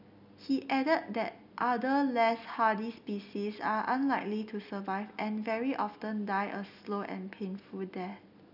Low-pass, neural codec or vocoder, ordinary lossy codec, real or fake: 5.4 kHz; none; none; real